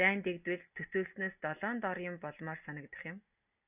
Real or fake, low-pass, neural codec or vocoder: real; 3.6 kHz; none